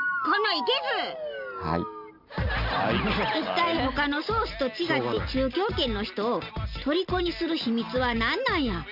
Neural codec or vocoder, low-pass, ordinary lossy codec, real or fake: none; 5.4 kHz; none; real